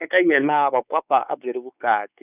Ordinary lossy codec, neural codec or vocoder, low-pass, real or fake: none; codec, 16 kHz, 4 kbps, X-Codec, WavLM features, trained on Multilingual LibriSpeech; 3.6 kHz; fake